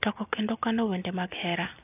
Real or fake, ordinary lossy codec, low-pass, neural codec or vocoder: real; AAC, 32 kbps; 3.6 kHz; none